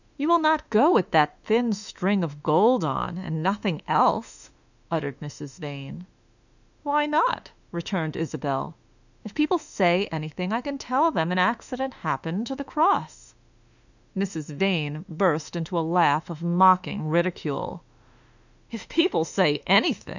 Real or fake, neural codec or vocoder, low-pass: fake; autoencoder, 48 kHz, 32 numbers a frame, DAC-VAE, trained on Japanese speech; 7.2 kHz